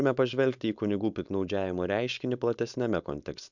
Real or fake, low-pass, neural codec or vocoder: fake; 7.2 kHz; codec, 16 kHz, 4.8 kbps, FACodec